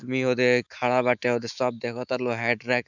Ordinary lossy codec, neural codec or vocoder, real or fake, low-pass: none; autoencoder, 48 kHz, 128 numbers a frame, DAC-VAE, trained on Japanese speech; fake; 7.2 kHz